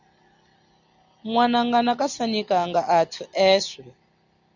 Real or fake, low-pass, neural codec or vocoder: real; 7.2 kHz; none